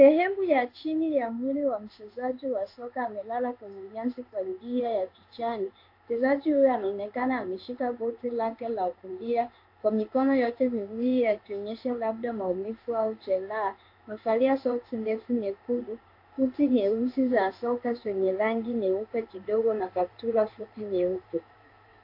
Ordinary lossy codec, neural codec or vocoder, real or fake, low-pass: AAC, 32 kbps; codec, 16 kHz in and 24 kHz out, 1 kbps, XY-Tokenizer; fake; 5.4 kHz